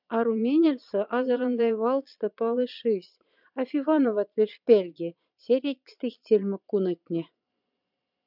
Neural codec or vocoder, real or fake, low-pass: vocoder, 22.05 kHz, 80 mel bands, Vocos; fake; 5.4 kHz